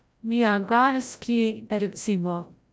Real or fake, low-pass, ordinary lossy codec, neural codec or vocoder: fake; none; none; codec, 16 kHz, 0.5 kbps, FreqCodec, larger model